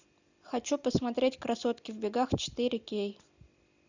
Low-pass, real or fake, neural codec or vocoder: 7.2 kHz; real; none